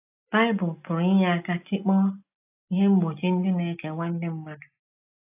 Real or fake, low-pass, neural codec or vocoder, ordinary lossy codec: real; 3.6 kHz; none; AAC, 32 kbps